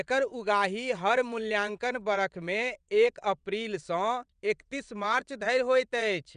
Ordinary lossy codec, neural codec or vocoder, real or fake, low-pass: none; vocoder, 22.05 kHz, 80 mel bands, WaveNeXt; fake; 9.9 kHz